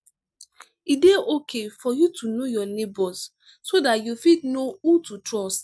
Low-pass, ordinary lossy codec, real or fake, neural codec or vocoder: none; none; real; none